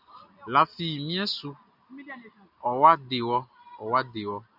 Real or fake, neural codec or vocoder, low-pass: real; none; 5.4 kHz